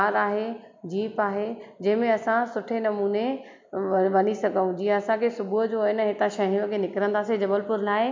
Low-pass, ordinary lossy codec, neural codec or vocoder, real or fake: 7.2 kHz; MP3, 64 kbps; none; real